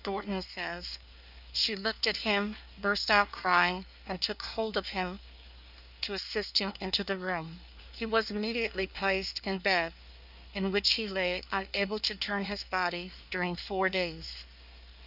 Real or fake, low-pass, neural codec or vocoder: fake; 5.4 kHz; codec, 24 kHz, 1 kbps, SNAC